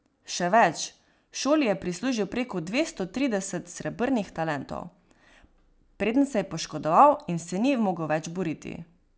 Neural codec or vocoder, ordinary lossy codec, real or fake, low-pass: none; none; real; none